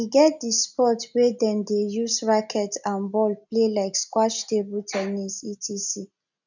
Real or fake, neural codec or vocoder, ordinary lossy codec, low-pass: real; none; none; 7.2 kHz